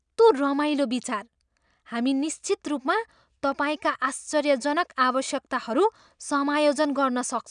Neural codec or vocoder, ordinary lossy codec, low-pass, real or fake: none; none; 9.9 kHz; real